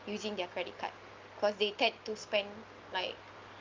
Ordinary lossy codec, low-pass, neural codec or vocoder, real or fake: Opus, 24 kbps; 7.2 kHz; none; real